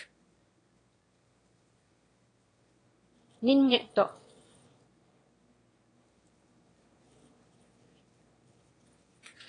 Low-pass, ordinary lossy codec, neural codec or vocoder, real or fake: 9.9 kHz; AAC, 32 kbps; autoencoder, 22.05 kHz, a latent of 192 numbers a frame, VITS, trained on one speaker; fake